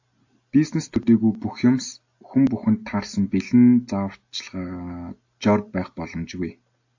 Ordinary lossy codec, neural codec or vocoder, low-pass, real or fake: AAC, 48 kbps; none; 7.2 kHz; real